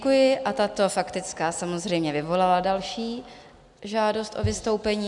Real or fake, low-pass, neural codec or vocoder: real; 10.8 kHz; none